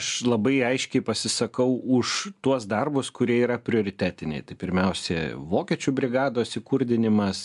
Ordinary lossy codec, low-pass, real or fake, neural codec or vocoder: AAC, 96 kbps; 10.8 kHz; real; none